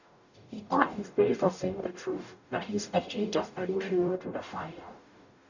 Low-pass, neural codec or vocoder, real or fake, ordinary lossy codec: 7.2 kHz; codec, 44.1 kHz, 0.9 kbps, DAC; fake; none